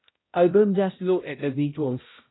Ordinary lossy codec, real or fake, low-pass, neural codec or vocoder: AAC, 16 kbps; fake; 7.2 kHz; codec, 16 kHz, 0.5 kbps, X-Codec, HuBERT features, trained on balanced general audio